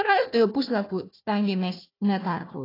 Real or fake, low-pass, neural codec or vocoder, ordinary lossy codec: fake; 5.4 kHz; codec, 16 kHz, 1 kbps, FunCodec, trained on Chinese and English, 50 frames a second; AAC, 24 kbps